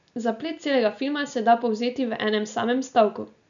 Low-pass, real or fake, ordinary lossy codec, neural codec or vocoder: 7.2 kHz; real; none; none